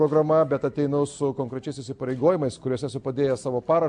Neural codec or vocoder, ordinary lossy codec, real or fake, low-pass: none; MP3, 64 kbps; real; 10.8 kHz